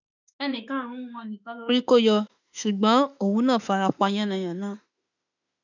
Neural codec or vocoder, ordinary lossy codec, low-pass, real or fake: autoencoder, 48 kHz, 32 numbers a frame, DAC-VAE, trained on Japanese speech; none; 7.2 kHz; fake